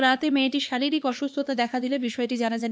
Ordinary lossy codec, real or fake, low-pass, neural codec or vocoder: none; fake; none; codec, 16 kHz, 2 kbps, X-Codec, HuBERT features, trained on LibriSpeech